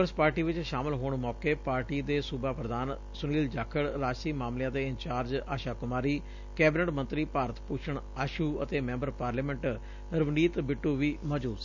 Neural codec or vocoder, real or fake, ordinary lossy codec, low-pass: none; real; none; 7.2 kHz